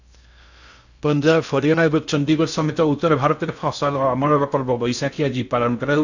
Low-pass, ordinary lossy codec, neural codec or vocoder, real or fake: 7.2 kHz; none; codec, 16 kHz in and 24 kHz out, 0.6 kbps, FocalCodec, streaming, 2048 codes; fake